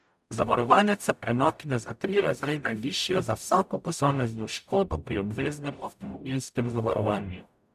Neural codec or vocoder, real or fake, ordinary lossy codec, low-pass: codec, 44.1 kHz, 0.9 kbps, DAC; fake; none; 14.4 kHz